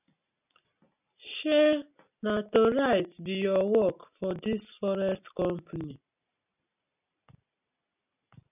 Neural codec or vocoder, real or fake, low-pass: none; real; 3.6 kHz